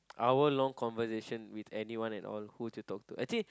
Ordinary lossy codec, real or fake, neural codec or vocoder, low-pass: none; real; none; none